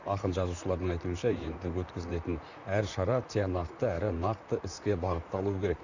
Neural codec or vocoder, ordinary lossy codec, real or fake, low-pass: vocoder, 44.1 kHz, 128 mel bands, Pupu-Vocoder; none; fake; 7.2 kHz